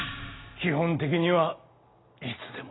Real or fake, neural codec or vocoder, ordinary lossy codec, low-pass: real; none; AAC, 16 kbps; 7.2 kHz